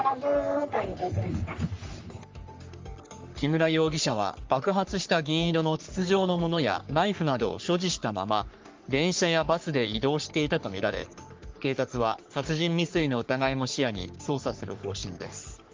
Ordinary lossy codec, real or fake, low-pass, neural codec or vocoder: Opus, 32 kbps; fake; 7.2 kHz; codec, 44.1 kHz, 3.4 kbps, Pupu-Codec